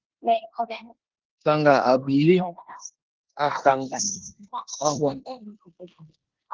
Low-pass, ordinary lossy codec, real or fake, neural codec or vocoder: 7.2 kHz; Opus, 16 kbps; fake; codec, 16 kHz in and 24 kHz out, 0.9 kbps, LongCat-Audio-Codec, four codebook decoder